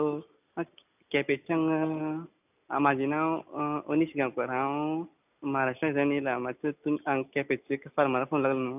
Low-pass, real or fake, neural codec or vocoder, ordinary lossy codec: 3.6 kHz; real; none; none